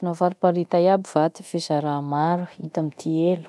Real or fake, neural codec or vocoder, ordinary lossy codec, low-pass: fake; codec, 24 kHz, 0.9 kbps, DualCodec; none; none